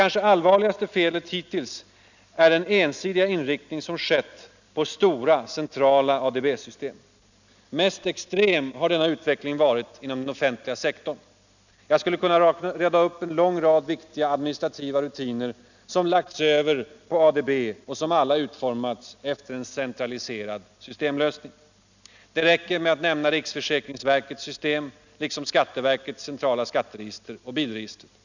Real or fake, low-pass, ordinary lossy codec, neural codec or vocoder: real; 7.2 kHz; none; none